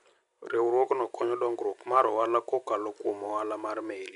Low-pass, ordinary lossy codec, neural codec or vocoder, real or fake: 10.8 kHz; MP3, 96 kbps; none; real